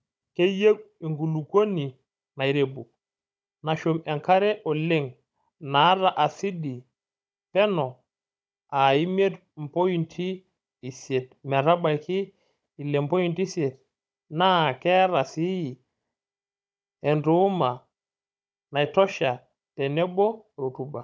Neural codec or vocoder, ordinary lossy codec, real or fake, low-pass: codec, 16 kHz, 16 kbps, FunCodec, trained on Chinese and English, 50 frames a second; none; fake; none